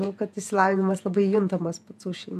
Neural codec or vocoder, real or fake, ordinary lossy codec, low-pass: vocoder, 48 kHz, 128 mel bands, Vocos; fake; MP3, 96 kbps; 14.4 kHz